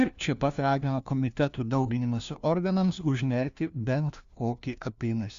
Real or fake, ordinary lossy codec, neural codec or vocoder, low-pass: fake; Opus, 64 kbps; codec, 16 kHz, 1 kbps, FunCodec, trained on LibriTTS, 50 frames a second; 7.2 kHz